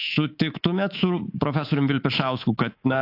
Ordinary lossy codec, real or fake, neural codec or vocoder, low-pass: AAC, 32 kbps; fake; codec, 24 kHz, 3.1 kbps, DualCodec; 5.4 kHz